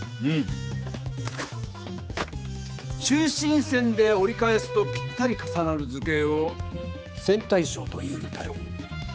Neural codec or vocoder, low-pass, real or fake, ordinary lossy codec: codec, 16 kHz, 4 kbps, X-Codec, HuBERT features, trained on general audio; none; fake; none